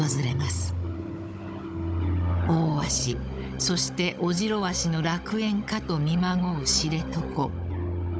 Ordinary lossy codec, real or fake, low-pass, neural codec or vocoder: none; fake; none; codec, 16 kHz, 16 kbps, FunCodec, trained on Chinese and English, 50 frames a second